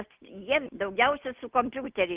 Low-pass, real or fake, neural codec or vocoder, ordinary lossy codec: 3.6 kHz; real; none; Opus, 24 kbps